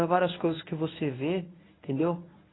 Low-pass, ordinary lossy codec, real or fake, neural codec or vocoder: 7.2 kHz; AAC, 16 kbps; fake; codec, 24 kHz, 0.9 kbps, WavTokenizer, medium speech release version 1